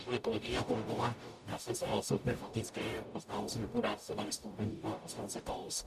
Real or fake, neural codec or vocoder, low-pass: fake; codec, 44.1 kHz, 0.9 kbps, DAC; 14.4 kHz